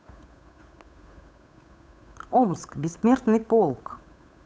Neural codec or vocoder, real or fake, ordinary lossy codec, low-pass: codec, 16 kHz, 8 kbps, FunCodec, trained on Chinese and English, 25 frames a second; fake; none; none